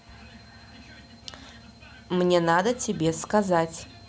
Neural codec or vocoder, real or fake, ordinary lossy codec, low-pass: none; real; none; none